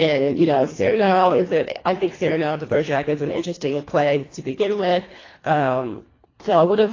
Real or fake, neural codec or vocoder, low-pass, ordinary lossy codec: fake; codec, 24 kHz, 1.5 kbps, HILCodec; 7.2 kHz; AAC, 32 kbps